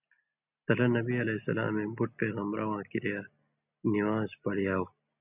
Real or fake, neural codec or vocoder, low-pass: real; none; 3.6 kHz